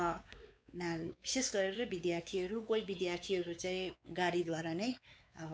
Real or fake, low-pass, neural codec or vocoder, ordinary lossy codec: fake; none; codec, 16 kHz, 4 kbps, X-Codec, WavLM features, trained on Multilingual LibriSpeech; none